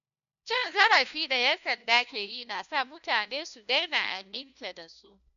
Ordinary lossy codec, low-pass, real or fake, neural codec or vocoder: none; 7.2 kHz; fake; codec, 16 kHz, 1 kbps, FunCodec, trained on LibriTTS, 50 frames a second